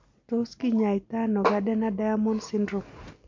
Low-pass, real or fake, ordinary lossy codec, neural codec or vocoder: 7.2 kHz; real; MP3, 48 kbps; none